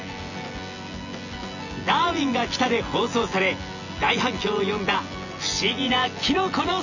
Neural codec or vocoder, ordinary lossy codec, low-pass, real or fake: vocoder, 24 kHz, 100 mel bands, Vocos; none; 7.2 kHz; fake